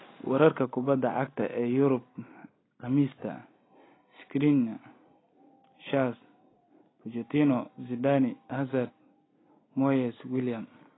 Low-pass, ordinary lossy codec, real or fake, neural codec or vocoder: 7.2 kHz; AAC, 16 kbps; real; none